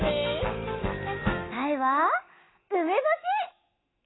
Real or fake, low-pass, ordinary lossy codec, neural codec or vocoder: fake; 7.2 kHz; AAC, 16 kbps; autoencoder, 48 kHz, 128 numbers a frame, DAC-VAE, trained on Japanese speech